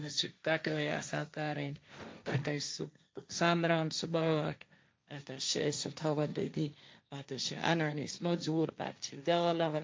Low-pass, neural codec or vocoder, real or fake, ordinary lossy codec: none; codec, 16 kHz, 1.1 kbps, Voila-Tokenizer; fake; none